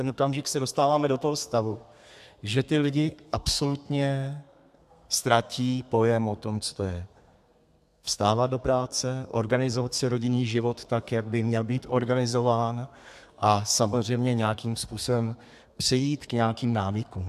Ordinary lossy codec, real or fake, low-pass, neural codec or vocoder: AAC, 96 kbps; fake; 14.4 kHz; codec, 32 kHz, 1.9 kbps, SNAC